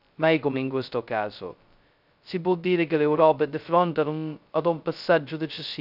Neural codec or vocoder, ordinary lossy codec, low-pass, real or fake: codec, 16 kHz, 0.2 kbps, FocalCodec; none; 5.4 kHz; fake